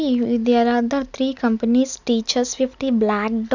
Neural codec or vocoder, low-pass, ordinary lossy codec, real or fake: none; 7.2 kHz; none; real